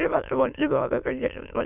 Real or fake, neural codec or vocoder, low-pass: fake; autoencoder, 22.05 kHz, a latent of 192 numbers a frame, VITS, trained on many speakers; 3.6 kHz